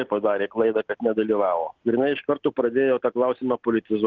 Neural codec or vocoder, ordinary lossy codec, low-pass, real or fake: none; Opus, 16 kbps; 7.2 kHz; real